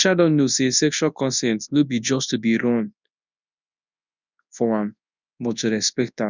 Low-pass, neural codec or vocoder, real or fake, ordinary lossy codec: 7.2 kHz; codec, 24 kHz, 0.9 kbps, WavTokenizer, large speech release; fake; none